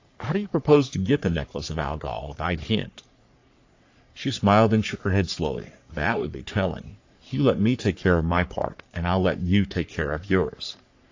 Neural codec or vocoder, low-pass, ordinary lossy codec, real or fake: codec, 44.1 kHz, 3.4 kbps, Pupu-Codec; 7.2 kHz; AAC, 48 kbps; fake